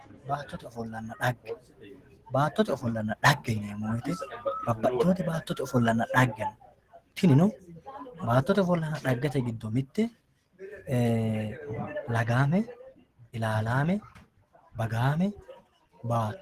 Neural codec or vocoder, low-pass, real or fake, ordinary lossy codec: none; 14.4 kHz; real; Opus, 16 kbps